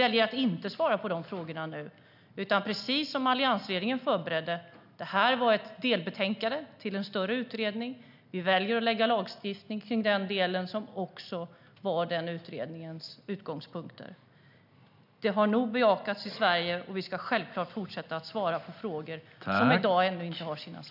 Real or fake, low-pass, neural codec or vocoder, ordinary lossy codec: real; 5.4 kHz; none; none